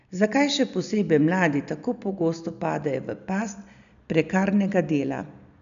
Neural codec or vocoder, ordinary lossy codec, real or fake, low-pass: none; none; real; 7.2 kHz